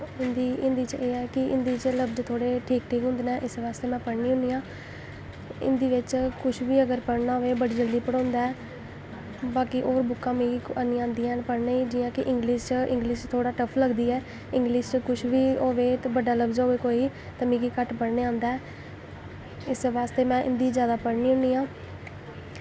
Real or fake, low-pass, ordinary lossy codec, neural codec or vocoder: real; none; none; none